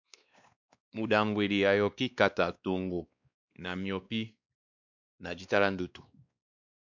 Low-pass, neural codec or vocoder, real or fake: 7.2 kHz; codec, 16 kHz, 2 kbps, X-Codec, WavLM features, trained on Multilingual LibriSpeech; fake